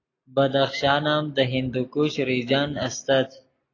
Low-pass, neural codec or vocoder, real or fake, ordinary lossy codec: 7.2 kHz; none; real; AAC, 32 kbps